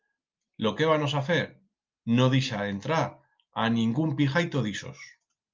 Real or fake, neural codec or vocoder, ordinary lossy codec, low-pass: real; none; Opus, 24 kbps; 7.2 kHz